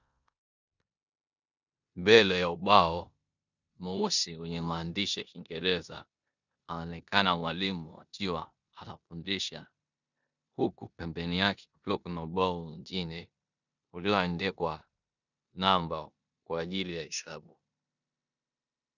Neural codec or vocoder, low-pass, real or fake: codec, 16 kHz in and 24 kHz out, 0.9 kbps, LongCat-Audio-Codec, four codebook decoder; 7.2 kHz; fake